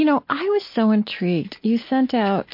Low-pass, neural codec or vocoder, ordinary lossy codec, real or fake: 5.4 kHz; none; MP3, 32 kbps; real